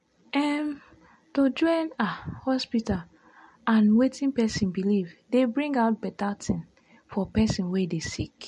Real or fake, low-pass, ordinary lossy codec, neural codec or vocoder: real; 14.4 kHz; MP3, 48 kbps; none